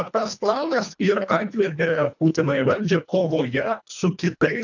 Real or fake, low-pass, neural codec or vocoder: fake; 7.2 kHz; codec, 24 kHz, 1.5 kbps, HILCodec